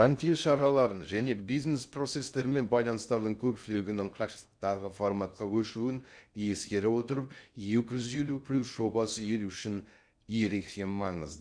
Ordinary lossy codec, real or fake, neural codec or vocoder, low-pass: none; fake; codec, 16 kHz in and 24 kHz out, 0.6 kbps, FocalCodec, streaming, 2048 codes; 9.9 kHz